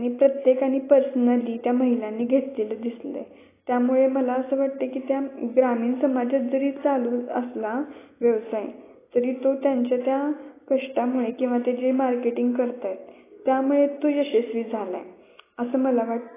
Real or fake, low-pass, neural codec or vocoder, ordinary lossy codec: real; 3.6 kHz; none; AAC, 16 kbps